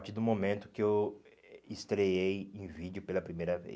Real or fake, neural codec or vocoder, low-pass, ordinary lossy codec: real; none; none; none